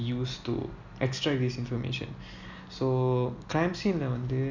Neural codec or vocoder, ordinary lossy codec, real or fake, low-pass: none; none; real; 7.2 kHz